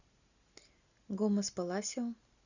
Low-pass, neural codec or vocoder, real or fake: 7.2 kHz; none; real